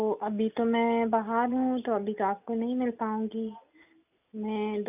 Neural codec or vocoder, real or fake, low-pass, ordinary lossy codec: none; real; 3.6 kHz; none